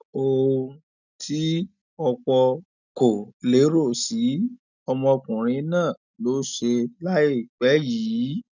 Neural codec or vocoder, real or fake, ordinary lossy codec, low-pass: none; real; none; 7.2 kHz